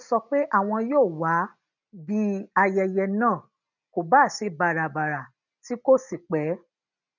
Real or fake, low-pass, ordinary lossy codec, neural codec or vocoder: real; 7.2 kHz; none; none